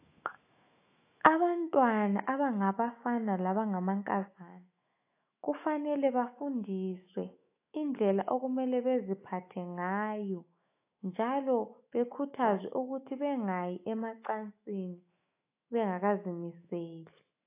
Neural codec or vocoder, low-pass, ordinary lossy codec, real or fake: autoencoder, 48 kHz, 128 numbers a frame, DAC-VAE, trained on Japanese speech; 3.6 kHz; AAC, 24 kbps; fake